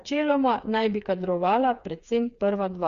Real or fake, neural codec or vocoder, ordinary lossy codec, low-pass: fake; codec, 16 kHz, 4 kbps, FreqCodec, smaller model; Opus, 64 kbps; 7.2 kHz